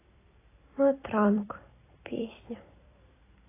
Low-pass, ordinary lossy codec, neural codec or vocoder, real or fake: 3.6 kHz; AAC, 16 kbps; vocoder, 44.1 kHz, 128 mel bands every 256 samples, BigVGAN v2; fake